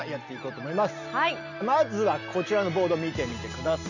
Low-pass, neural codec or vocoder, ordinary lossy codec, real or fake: 7.2 kHz; none; none; real